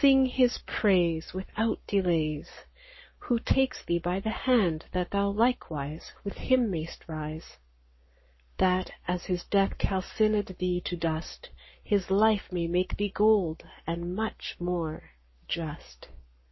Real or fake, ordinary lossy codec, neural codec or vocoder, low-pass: fake; MP3, 24 kbps; codec, 44.1 kHz, 7.8 kbps, Pupu-Codec; 7.2 kHz